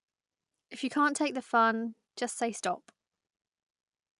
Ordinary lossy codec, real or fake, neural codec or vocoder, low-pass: MP3, 96 kbps; real; none; 10.8 kHz